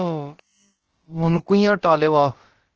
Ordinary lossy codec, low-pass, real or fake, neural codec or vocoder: Opus, 16 kbps; 7.2 kHz; fake; codec, 16 kHz, about 1 kbps, DyCAST, with the encoder's durations